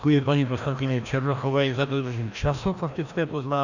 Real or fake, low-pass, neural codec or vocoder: fake; 7.2 kHz; codec, 16 kHz, 1 kbps, FreqCodec, larger model